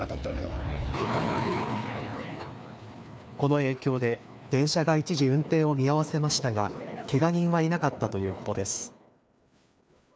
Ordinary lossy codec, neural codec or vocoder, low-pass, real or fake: none; codec, 16 kHz, 2 kbps, FreqCodec, larger model; none; fake